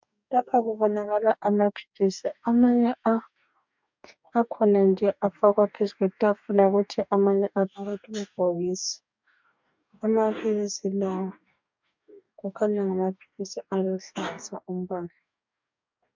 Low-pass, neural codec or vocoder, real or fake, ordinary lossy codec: 7.2 kHz; codec, 44.1 kHz, 2.6 kbps, DAC; fake; MP3, 64 kbps